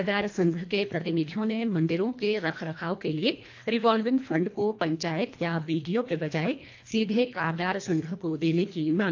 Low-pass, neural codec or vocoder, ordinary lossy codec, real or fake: 7.2 kHz; codec, 24 kHz, 1.5 kbps, HILCodec; AAC, 48 kbps; fake